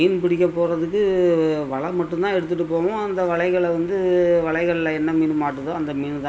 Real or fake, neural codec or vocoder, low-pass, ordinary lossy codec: real; none; none; none